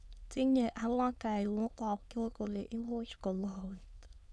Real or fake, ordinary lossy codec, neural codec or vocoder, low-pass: fake; none; autoencoder, 22.05 kHz, a latent of 192 numbers a frame, VITS, trained on many speakers; none